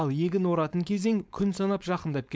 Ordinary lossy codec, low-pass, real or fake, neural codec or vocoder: none; none; fake; codec, 16 kHz, 4.8 kbps, FACodec